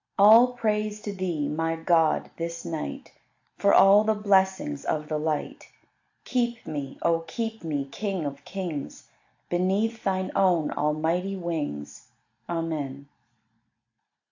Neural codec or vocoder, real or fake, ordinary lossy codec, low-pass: none; real; AAC, 48 kbps; 7.2 kHz